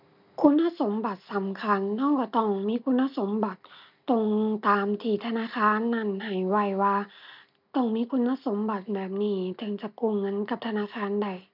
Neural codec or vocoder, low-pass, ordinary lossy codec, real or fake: none; 5.4 kHz; none; real